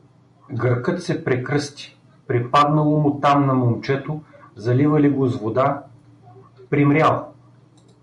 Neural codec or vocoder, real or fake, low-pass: none; real; 10.8 kHz